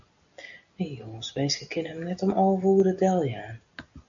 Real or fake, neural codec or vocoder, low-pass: real; none; 7.2 kHz